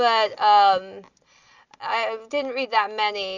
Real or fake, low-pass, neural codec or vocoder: real; 7.2 kHz; none